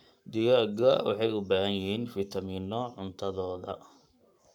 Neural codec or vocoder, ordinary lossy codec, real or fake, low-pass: codec, 44.1 kHz, 7.8 kbps, Pupu-Codec; none; fake; 19.8 kHz